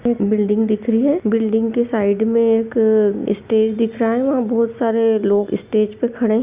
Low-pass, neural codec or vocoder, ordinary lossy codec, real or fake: 3.6 kHz; none; Opus, 64 kbps; real